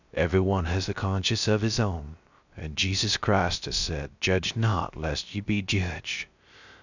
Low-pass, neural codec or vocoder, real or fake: 7.2 kHz; codec, 16 kHz, 0.3 kbps, FocalCodec; fake